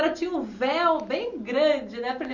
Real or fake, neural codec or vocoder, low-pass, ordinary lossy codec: real; none; 7.2 kHz; none